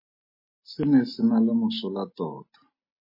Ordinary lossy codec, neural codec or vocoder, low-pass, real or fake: MP3, 24 kbps; none; 5.4 kHz; real